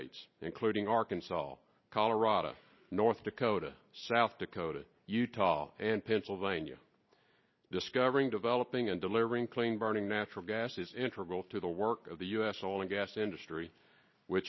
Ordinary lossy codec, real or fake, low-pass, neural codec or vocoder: MP3, 24 kbps; real; 7.2 kHz; none